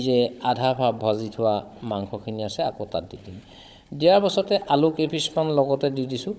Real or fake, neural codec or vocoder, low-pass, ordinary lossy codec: fake; codec, 16 kHz, 16 kbps, FunCodec, trained on Chinese and English, 50 frames a second; none; none